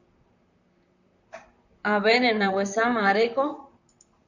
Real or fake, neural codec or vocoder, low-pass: fake; vocoder, 44.1 kHz, 128 mel bands, Pupu-Vocoder; 7.2 kHz